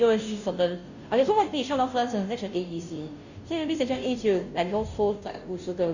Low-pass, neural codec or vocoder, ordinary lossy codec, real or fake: 7.2 kHz; codec, 16 kHz, 0.5 kbps, FunCodec, trained on Chinese and English, 25 frames a second; none; fake